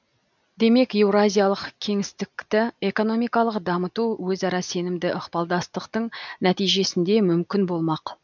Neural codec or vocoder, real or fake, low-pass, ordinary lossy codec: none; real; 7.2 kHz; none